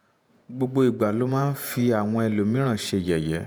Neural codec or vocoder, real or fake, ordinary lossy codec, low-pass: vocoder, 48 kHz, 128 mel bands, Vocos; fake; none; none